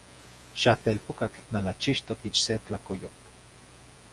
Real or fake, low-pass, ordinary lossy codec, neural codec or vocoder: fake; 10.8 kHz; Opus, 32 kbps; vocoder, 48 kHz, 128 mel bands, Vocos